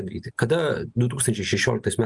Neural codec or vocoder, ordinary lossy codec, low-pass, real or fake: none; Opus, 32 kbps; 10.8 kHz; real